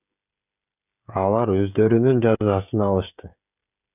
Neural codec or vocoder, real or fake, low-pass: codec, 16 kHz, 16 kbps, FreqCodec, smaller model; fake; 3.6 kHz